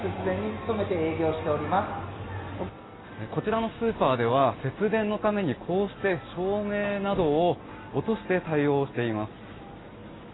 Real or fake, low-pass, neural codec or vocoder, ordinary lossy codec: real; 7.2 kHz; none; AAC, 16 kbps